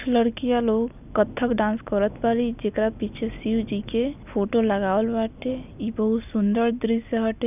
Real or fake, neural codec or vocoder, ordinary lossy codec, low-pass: real; none; none; 3.6 kHz